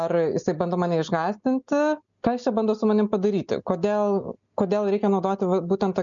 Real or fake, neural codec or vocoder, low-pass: real; none; 7.2 kHz